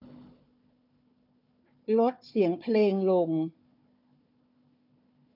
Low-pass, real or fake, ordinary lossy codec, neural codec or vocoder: 5.4 kHz; fake; none; codec, 16 kHz, 4 kbps, FunCodec, trained on Chinese and English, 50 frames a second